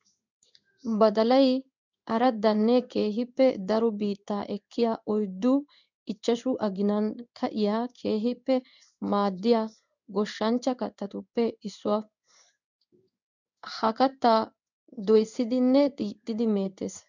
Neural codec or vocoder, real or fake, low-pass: codec, 16 kHz in and 24 kHz out, 1 kbps, XY-Tokenizer; fake; 7.2 kHz